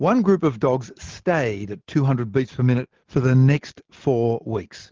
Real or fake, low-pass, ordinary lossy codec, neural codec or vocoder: real; 7.2 kHz; Opus, 16 kbps; none